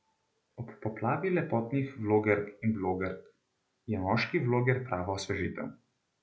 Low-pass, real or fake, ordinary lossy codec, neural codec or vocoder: none; real; none; none